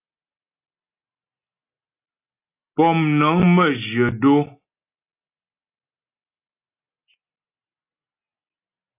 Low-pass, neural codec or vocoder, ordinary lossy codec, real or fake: 3.6 kHz; none; AAC, 32 kbps; real